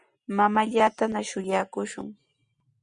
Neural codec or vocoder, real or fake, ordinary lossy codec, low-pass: none; real; Opus, 64 kbps; 9.9 kHz